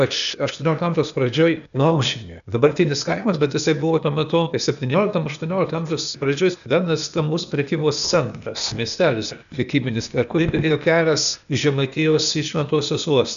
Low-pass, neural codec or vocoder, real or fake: 7.2 kHz; codec, 16 kHz, 0.8 kbps, ZipCodec; fake